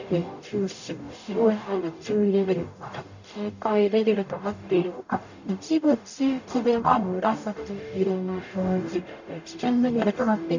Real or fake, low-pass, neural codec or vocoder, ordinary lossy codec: fake; 7.2 kHz; codec, 44.1 kHz, 0.9 kbps, DAC; none